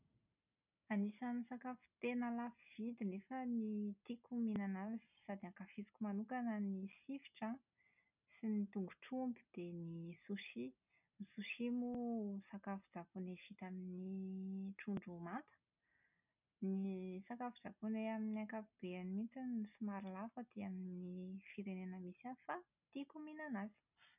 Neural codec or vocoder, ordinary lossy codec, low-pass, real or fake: none; none; 3.6 kHz; real